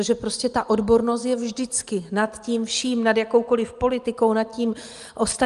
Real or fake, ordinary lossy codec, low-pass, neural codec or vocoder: real; Opus, 32 kbps; 10.8 kHz; none